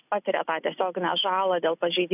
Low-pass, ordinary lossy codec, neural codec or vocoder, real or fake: 3.6 kHz; AAC, 32 kbps; none; real